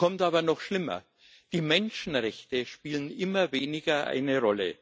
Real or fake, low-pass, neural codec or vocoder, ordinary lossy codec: real; none; none; none